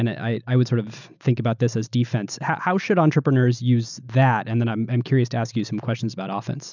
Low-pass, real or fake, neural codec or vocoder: 7.2 kHz; real; none